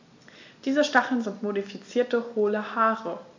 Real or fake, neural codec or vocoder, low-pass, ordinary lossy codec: real; none; 7.2 kHz; AAC, 48 kbps